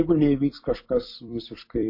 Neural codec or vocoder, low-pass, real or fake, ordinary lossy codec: vocoder, 44.1 kHz, 128 mel bands every 256 samples, BigVGAN v2; 5.4 kHz; fake; MP3, 32 kbps